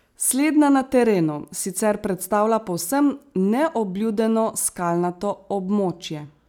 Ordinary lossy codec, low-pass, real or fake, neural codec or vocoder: none; none; real; none